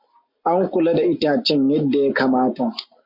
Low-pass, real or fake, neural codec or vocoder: 5.4 kHz; real; none